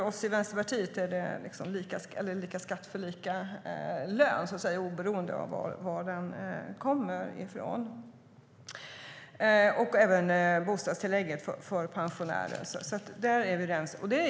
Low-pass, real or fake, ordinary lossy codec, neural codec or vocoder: none; real; none; none